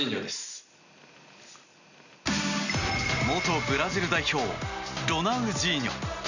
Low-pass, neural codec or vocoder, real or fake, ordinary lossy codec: 7.2 kHz; none; real; none